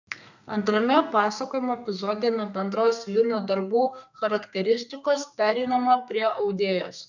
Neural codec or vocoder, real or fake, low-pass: codec, 32 kHz, 1.9 kbps, SNAC; fake; 7.2 kHz